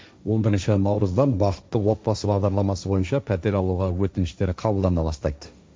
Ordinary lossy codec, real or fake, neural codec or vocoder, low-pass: none; fake; codec, 16 kHz, 1.1 kbps, Voila-Tokenizer; none